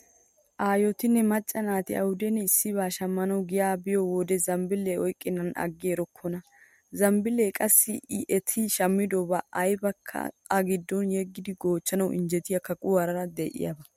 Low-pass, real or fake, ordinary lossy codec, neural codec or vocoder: 19.8 kHz; real; MP3, 64 kbps; none